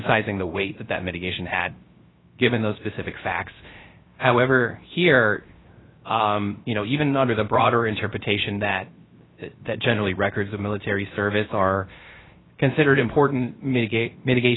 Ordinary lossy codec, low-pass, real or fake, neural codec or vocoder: AAC, 16 kbps; 7.2 kHz; fake; codec, 16 kHz, 0.3 kbps, FocalCodec